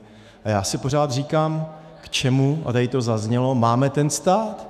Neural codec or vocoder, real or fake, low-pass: autoencoder, 48 kHz, 128 numbers a frame, DAC-VAE, trained on Japanese speech; fake; 14.4 kHz